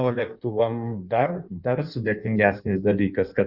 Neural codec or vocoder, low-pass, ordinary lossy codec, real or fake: codec, 16 kHz in and 24 kHz out, 1.1 kbps, FireRedTTS-2 codec; 5.4 kHz; Opus, 64 kbps; fake